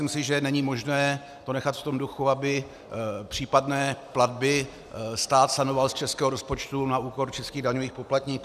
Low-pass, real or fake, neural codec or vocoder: 14.4 kHz; real; none